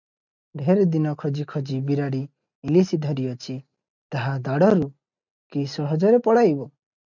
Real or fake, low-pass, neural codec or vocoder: real; 7.2 kHz; none